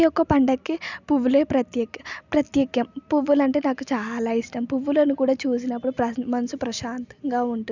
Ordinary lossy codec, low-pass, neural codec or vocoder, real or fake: none; 7.2 kHz; none; real